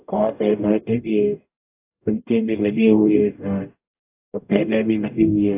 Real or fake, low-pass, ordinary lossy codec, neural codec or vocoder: fake; 3.6 kHz; AAC, 24 kbps; codec, 44.1 kHz, 0.9 kbps, DAC